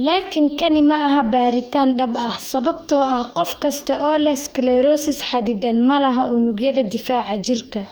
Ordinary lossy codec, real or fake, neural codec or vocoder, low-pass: none; fake; codec, 44.1 kHz, 2.6 kbps, DAC; none